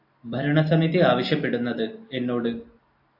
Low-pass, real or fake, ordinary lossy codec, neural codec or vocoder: 5.4 kHz; real; AAC, 48 kbps; none